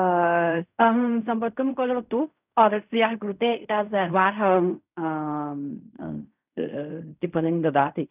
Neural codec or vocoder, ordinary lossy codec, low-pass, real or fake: codec, 16 kHz in and 24 kHz out, 0.4 kbps, LongCat-Audio-Codec, fine tuned four codebook decoder; none; 3.6 kHz; fake